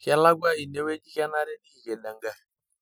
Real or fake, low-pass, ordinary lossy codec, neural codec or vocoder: real; none; none; none